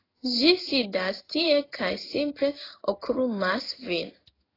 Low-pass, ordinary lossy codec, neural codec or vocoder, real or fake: 5.4 kHz; AAC, 24 kbps; codec, 16 kHz in and 24 kHz out, 1 kbps, XY-Tokenizer; fake